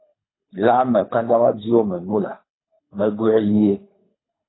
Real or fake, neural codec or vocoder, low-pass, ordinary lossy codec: fake; codec, 24 kHz, 3 kbps, HILCodec; 7.2 kHz; AAC, 16 kbps